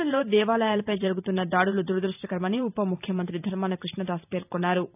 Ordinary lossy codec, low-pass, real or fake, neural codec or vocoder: none; 3.6 kHz; fake; vocoder, 44.1 kHz, 128 mel bands every 512 samples, BigVGAN v2